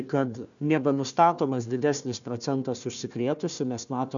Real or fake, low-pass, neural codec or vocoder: fake; 7.2 kHz; codec, 16 kHz, 1 kbps, FunCodec, trained on Chinese and English, 50 frames a second